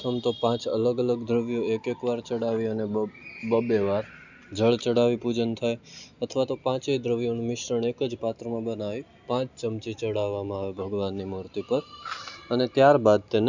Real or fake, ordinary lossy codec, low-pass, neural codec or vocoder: real; none; 7.2 kHz; none